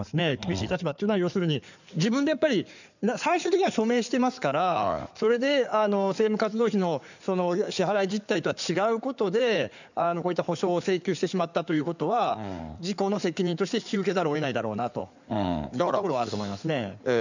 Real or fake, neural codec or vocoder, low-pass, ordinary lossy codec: fake; codec, 16 kHz in and 24 kHz out, 2.2 kbps, FireRedTTS-2 codec; 7.2 kHz; none